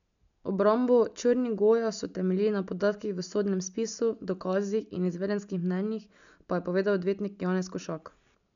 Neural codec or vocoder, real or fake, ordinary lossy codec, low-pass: none; real; none; 7.2 kHz